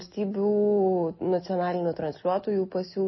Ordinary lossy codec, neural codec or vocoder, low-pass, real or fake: MP3, 24 kbps; none; 7.2 kHz; real